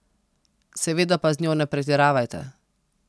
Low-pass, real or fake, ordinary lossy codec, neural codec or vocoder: none; real; none; none